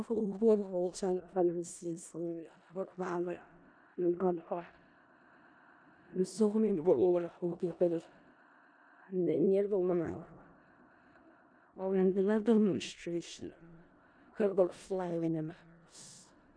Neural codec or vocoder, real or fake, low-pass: codec, 16 kHz in and 24 kHz out, 0.4 kbps, LongCat-Audio-Codec, four codebook decoder; fake; 9.9 kHz